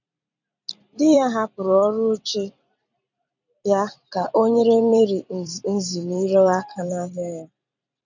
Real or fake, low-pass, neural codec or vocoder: fake; 7.2 kHz; vocoder, 44.1 kHz, 128 mel bands every 256 samples, BigVGAN v2